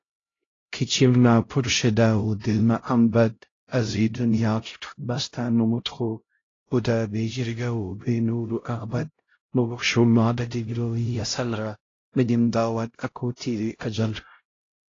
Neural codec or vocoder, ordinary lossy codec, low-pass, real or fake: codec, 16 kHz, 0.5 kbps, X-Codec, HuBERT features, trained on LibriSpeech; AAC, 32 kbps; 7.2 kHz; fake